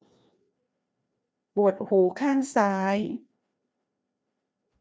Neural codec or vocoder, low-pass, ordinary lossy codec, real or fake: codec, 16 kHz, 2 kbps, FreqCodec, larger model; none; none; fake